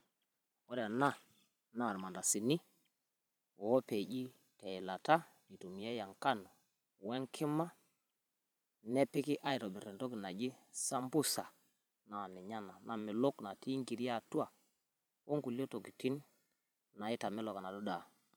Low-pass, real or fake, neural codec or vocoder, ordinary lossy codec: none; fake; vocoder, 44.1 kHz, 128 mel bands every 512 samples, BigVGAN v2; none